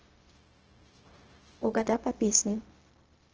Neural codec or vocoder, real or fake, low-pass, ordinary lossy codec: codec, 16 kHz, 0.4 kbps, LongCat-Audio-Codec; fake; 7.2 kHz; Opus, 16 kbps